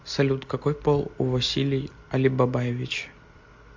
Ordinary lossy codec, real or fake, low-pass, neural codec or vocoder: MP3, 48 kbps; real; 7.2 kHz; none